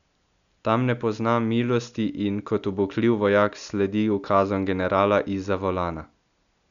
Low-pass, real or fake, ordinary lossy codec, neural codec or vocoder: 7.2 kHz; real; none; none